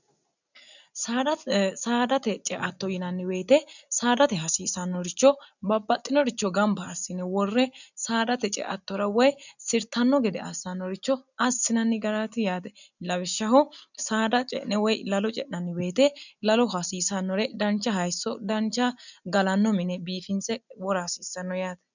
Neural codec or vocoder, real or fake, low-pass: none; real; 7.2 kHz